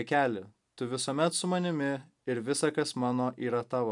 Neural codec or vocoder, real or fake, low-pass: none; real; 10.8 kHz